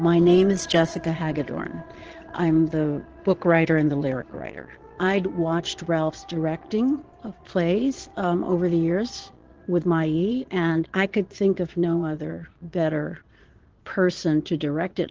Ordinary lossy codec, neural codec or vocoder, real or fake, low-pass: Opus, 16 kbps; vocoder, 22.05 kHz, 80 mel bands, Vocos; fake; 7.2 kHz